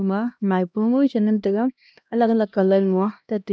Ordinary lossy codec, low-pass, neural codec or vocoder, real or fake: none; none; codec, 16 kHz, 1 kbps, X-Codec, HuBERT features, trained on LibriSpeech; fake